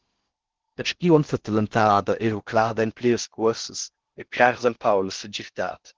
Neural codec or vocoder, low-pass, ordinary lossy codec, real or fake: codec, 16 kHz in and 24 kHz out, 0.6 kbps, FocalCodec, streaming, 4096 codes; 7.2 kHz; Opus, 16 kbps; fake